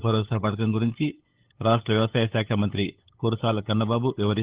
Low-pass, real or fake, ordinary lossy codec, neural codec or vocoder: 3.6 kHz; fake; Opus, 16 kbps; codec, 16 kHz, 8 kbps, FunCodec, trained on LibriTTS, 25 frames a second